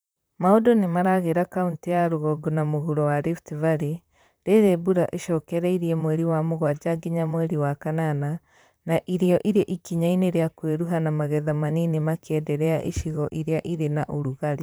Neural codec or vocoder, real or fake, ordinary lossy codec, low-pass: vocoder, 44.1 kHz, 128 mel bands, Pupu-Vocoder; fake; none; none